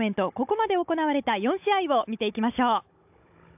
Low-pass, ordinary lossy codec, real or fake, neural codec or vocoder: 3.6 kHz; AAC, 32 kbps; fake; codec, 16 kHz, 16 kbps, FunCodec, trained on Chinese and English, 50 frames a second